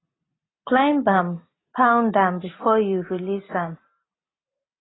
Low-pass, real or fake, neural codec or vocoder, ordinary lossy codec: 7.2 kHz; real; none; AAC, 16 kbps